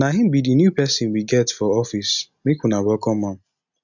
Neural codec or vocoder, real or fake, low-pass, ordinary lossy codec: none; real; 7.2 kHz; none